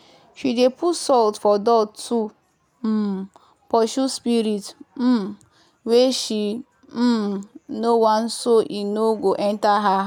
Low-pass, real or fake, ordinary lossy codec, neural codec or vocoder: 19.8 kHz; real; none; none